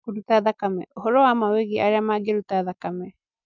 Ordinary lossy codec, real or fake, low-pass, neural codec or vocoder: none; real; 7.2 kHz; none